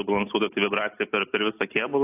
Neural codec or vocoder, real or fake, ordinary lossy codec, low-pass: none; real; AAC, 32 kbps; 3.6 kHz